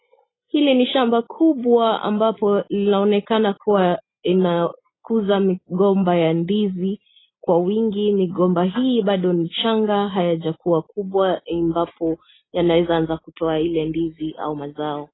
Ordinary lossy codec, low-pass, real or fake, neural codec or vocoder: AAC, 16 kbps; 7.2 kHz; real; none